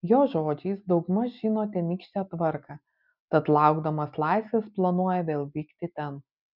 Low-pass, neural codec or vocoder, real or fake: 5.4 kHz; none; real